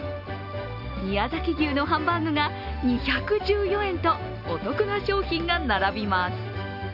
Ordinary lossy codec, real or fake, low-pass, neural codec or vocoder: none; real; 5.4 kHz; none